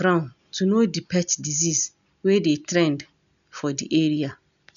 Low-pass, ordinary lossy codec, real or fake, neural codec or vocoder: 7.2 kHz; none; real; none